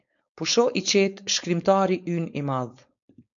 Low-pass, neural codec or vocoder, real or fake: 7.2 kHz; codec, 16 kHz, 4.8 kbps, FACodec; fake